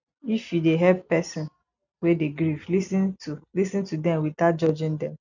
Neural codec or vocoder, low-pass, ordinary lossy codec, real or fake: none; 7.2 kHz; none; real